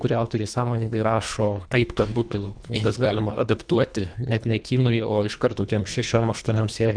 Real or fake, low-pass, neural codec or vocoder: fake; 9.9 kHz; codec, 24 kHz, 1.5 kbps, HILCodec